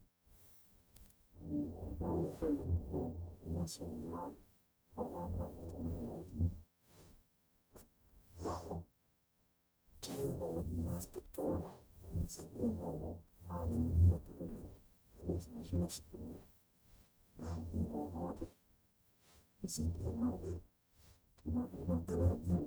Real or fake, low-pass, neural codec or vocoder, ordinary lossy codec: fake; none; codec, 44.1 kHz, 0.9 kbps, DAC; none